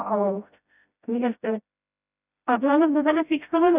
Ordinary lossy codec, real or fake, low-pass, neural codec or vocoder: none; fake; 3.6 kHz; codec, 16 kHz, 0.5 kbps, FreqCodec, smaller model